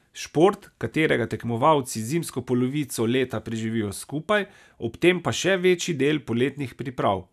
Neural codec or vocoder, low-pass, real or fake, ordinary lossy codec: none; 14.4 kHz; real; none